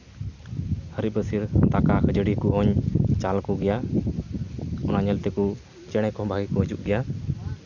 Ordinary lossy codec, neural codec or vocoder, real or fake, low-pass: none; none; real; 7.2 kHz